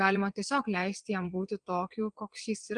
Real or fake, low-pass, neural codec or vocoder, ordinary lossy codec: fake; 9.9 kHz; vocoder, 22.05 kHz, 80 mel bands, WaveNeXt; Opus, 24 kbps